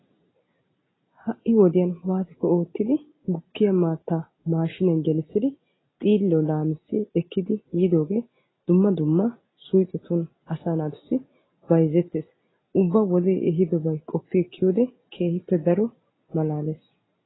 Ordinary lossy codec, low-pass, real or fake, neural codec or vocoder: AAC, 16 kbps; 7.2 kHz; real; none